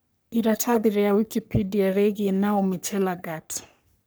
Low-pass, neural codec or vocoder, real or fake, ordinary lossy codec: none; codec, 44.1 kHz, 3.4 kbps, Pupu-Codec; fake; none